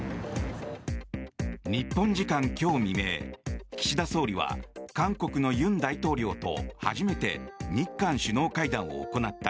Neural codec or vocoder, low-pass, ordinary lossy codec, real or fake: none; none; none; real